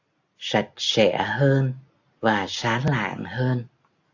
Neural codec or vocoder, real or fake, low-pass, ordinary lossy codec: none; real; 7.2 kHz; AAC, 48 kbps